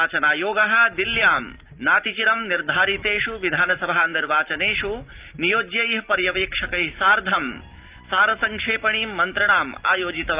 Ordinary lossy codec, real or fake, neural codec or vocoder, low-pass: Opus, 24 kbps; real; none; 3.6 kHz